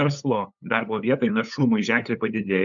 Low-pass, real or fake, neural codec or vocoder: 7.2 kHz; fake; codec, 16 kHz, 8 kbps, FunCodec, trained on LibriTTS, 25 frames a second